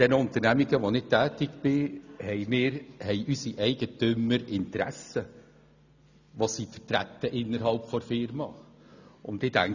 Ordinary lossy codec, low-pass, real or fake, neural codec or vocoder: none; 7.2 kHz; real; none